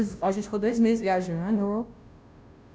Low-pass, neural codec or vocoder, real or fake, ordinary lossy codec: none; codec, 16 kHz, 0.5 kbps, FunCodec, trained on Chinese and English, 25 frames a second; fake; none